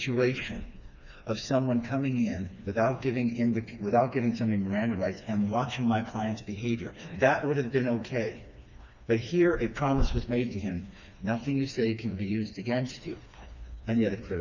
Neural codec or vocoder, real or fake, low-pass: codec, 16 kHz, 2 kbps, FreqCodec, smaller model; fake; 7.2 kHz